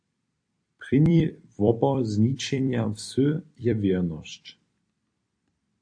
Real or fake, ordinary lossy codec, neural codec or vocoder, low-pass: fake; AAC, 48 kbps; vocoder, 44.1 kHz, 128 mel bands every 256 samples, BigVGAN v2; 9.9 kHz